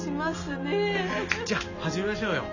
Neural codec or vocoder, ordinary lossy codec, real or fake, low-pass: none; none; real; 7.2 kHz